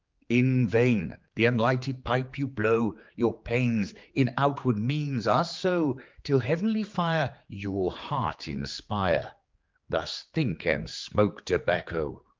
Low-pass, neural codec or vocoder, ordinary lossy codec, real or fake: 7.2 kHz; codec, 16 kHz, 4 kbps, X-Codec, HuBERT features, trained on general audio; Opus, 32 kbps; fake